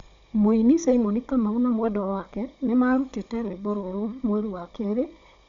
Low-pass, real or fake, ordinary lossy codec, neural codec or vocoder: 7.2 kHz; fake; Opus, 64 kbps; codec, 16 kHz, 16 kbps, FunCodec, trained on Chinese and English, 50 frames a second